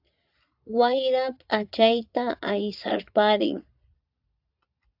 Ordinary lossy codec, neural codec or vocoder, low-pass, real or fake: AAC, 48 kbps; vocoder, 22.05 kHz, 80 mel bands, Vocos; 5.4 kHz; fake